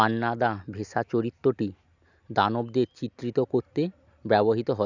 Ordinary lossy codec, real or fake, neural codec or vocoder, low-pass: none; real; none; 7.2 kHz